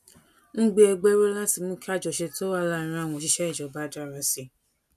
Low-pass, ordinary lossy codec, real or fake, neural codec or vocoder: 14.4 kHz; none; real; none